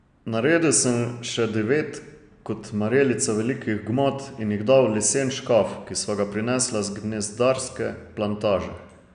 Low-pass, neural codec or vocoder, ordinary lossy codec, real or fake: 9.9 kHz; none; none; real